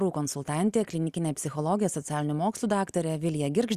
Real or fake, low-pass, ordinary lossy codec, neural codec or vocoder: real; 14.4 kHz; Opus, 64 kbps; none